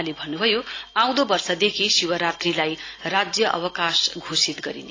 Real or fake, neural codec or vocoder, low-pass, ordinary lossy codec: real; none; 7.2 kHz; AAC, 32 kbps